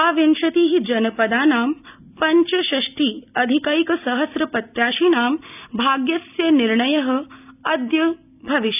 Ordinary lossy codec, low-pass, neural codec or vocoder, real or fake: none; 3.6 kHz; none; real